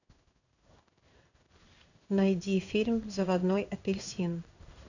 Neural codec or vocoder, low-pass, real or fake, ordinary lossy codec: codec, 16 kHz in and 24 kHz out, 1 kbps, XY-Tokenizer; 7.2 kHz; fake; none